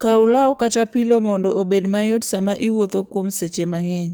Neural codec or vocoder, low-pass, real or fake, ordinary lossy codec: codec, 44.1 kHz, 2.6 kbps, SNAC; none; fake; none